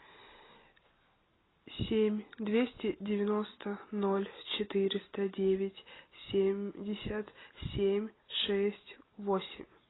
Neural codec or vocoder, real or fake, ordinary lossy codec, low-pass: none; real; AAC, 16 kbps; 7.2 kHz